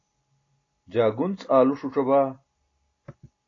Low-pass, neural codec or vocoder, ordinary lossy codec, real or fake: 7.2 kHz; none; AAC, 32 kbps; real